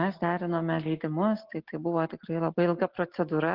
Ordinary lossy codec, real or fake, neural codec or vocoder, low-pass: Opus, 16 kbps; real; none; 5.4 kHz